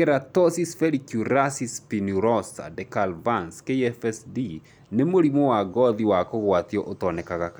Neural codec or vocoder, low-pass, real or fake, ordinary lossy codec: none; none; real; none